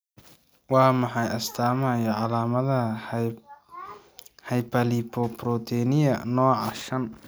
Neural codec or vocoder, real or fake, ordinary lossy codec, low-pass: none; real; none; none